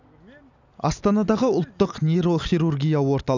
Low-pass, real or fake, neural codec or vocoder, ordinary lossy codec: 7.2 kHz; real; none; none